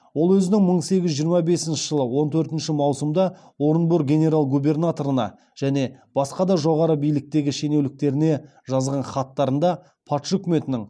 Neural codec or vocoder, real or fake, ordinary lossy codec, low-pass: none; real; none; none